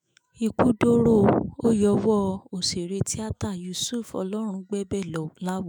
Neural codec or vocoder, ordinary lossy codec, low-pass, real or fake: autoencoder, 48 kHz, 128 numbers a frame, DAC-VAE, trained on Japanese speech; none; none; fake